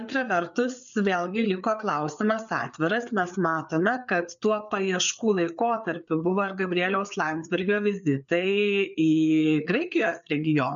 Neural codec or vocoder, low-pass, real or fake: codec, 16 kHz, 4 kbps, FreqCodec, larger model; 7.2 kHz; fake